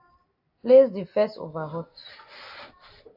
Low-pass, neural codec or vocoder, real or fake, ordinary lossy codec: 5.4 kHz; none; real; AAC, 48 kbps